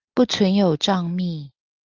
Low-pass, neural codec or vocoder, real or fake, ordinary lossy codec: 7.2 kHz; none; real; Opus, 24 kbps